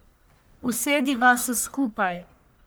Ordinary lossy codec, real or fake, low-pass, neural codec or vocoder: none; fake; none; codec, 44.1 kHz, 1.7 kbps, Pupu-Codec